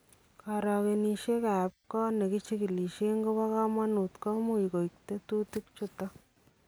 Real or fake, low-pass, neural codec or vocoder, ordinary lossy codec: real; none; none; none